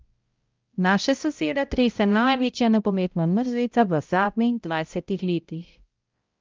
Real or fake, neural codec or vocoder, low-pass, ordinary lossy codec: fake; codec, 16 kHz, 0.5 kbps, X-Codec, HuBERT features, trained on balanced general audio; 7.2 kHz; Opus, 24 kbps